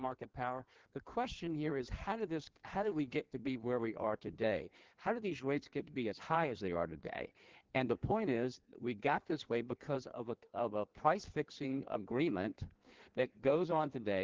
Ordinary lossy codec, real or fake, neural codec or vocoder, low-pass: Opus, 16 kbps; fake; codec, 16 kHz in and 24 kHz out, 1.1 kbps, FireRedTTS-2 codec; 7.2 kHz